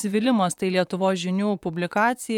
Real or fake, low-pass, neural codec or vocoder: fake; 19.8 kHz; vocoder, 44.1 kHz, 128 mel bands, Pupu-Vocoder